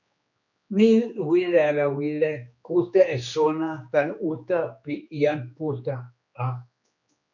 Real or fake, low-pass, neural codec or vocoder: fake; 7.2 kHz; codec, 16 kHz, 2 kbps, X-Codec, HuBERT features, trained on general audio